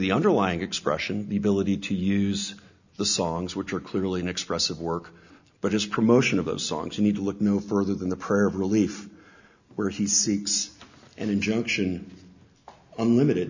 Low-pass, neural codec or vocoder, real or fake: 7.2 kHz; none; real